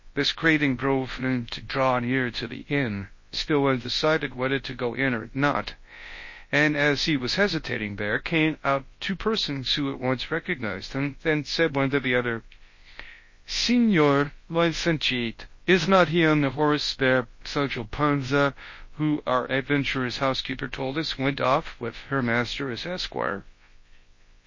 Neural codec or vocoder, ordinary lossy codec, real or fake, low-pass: codec, 24 kHz, 0.9 kbps, WavTokenizer, large speech release; MP3, 32 kbps; fake; 7.2 kHz